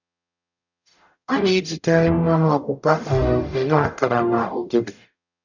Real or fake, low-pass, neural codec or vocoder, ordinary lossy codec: fake; 7.2 kHz; codec, 44.1 kHz, 0.9 kbps, DAC; none